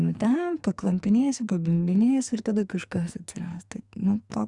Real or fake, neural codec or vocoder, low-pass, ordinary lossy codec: fake; codec, 44.1 kHz, 2.6 kbps, SNAC; 10.8 kHz; Opus, 64 kbps